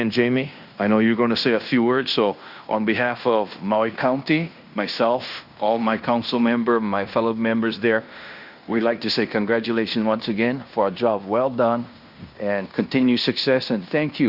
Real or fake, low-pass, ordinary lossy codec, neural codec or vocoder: fake; 5.4 kHz; Opus, 64 kbps; codec, 16 kHz in and 24 kHz out, 0.9 kbps, LongCat-Audio-Codec, fine tuned four codebook decoder